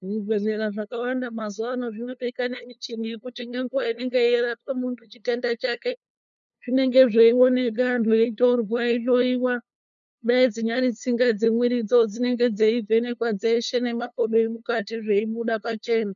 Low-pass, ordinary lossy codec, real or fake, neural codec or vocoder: 7.2 kHz; MP3, 96 kbps; fake; codec, 16 kHz, 2 kbps, FunCodec, trained on LibriTTS, 25 frames a second